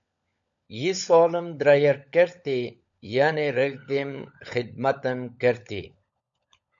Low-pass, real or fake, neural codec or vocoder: 7.2 kHz; fake; codec, 16 kHz, 16 kbps, FunCodec, trained on LibriTTS, 50 frames a second